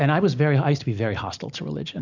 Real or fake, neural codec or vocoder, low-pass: real; none; 7.2 kHz